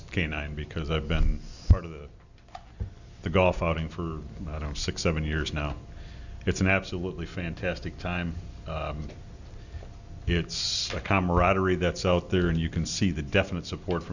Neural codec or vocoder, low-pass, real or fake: none; 7.2 kHz; real